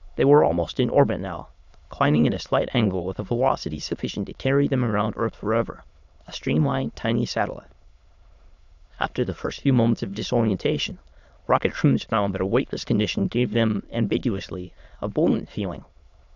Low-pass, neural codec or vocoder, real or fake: 7.2 kHz; autoencoder, 22.05 kHz, a latent of 192 numbers a frame, VITS, trained on many speakers; fake